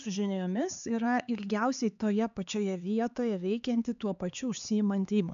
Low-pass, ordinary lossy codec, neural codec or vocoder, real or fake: 7.2 kHz; AAC, 96 kbps; codec, 16 kHz, 4 kbps, X-Codec, HuBERT features, trained on LibriSpeech; fake